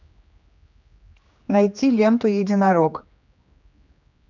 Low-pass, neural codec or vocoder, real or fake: 7.2 kHz; codec, 16 kHz, 2 kbps, X-Codec, HuBERT features, trained on general audio; fake